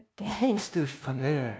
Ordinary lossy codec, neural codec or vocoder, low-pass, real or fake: none; codec, 16 kHz, 0.5 kbps, FunCodec, trained on LibriTTS, 25 frames a second; none; fake